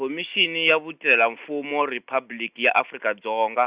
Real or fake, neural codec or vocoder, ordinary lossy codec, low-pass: real; none; Opus, 64 kbps; 3.6 kHz